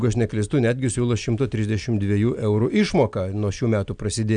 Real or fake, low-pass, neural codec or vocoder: real; 9.9 kHz; none